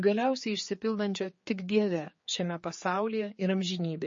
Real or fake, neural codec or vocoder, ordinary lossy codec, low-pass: fake; codec, 16 kHz, 4 kbps, X-Codec, HuBERT features, trained on general audio; MP3, 32 kbps; 7.2 kHz